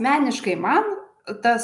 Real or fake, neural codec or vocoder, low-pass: real; none; 10.8 kHz